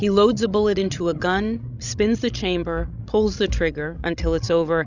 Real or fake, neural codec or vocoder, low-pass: fake; codec, 16 kHz, 16 kbps, FreqCodec, larger model; 7.2 kHz